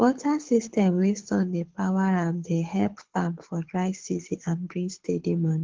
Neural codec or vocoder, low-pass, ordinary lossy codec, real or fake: codec, 24 kHz, 6 kbps, HILCodec; 7.2 kHz; Opus, 16 kbps; fake